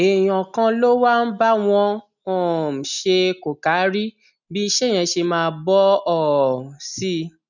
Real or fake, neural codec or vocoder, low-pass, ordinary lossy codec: real; none; 7.2 kHz; none